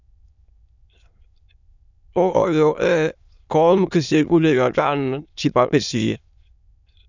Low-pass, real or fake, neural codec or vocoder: 7.2 kHz; fake; autoencoder, 22.05 kHz, a latent of 192 numbers a frame, VITS, trained on many speakers